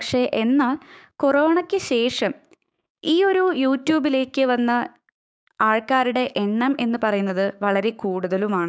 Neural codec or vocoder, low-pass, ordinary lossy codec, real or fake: codec, 16 kHz, 6 kbps, DAC; none; none; fake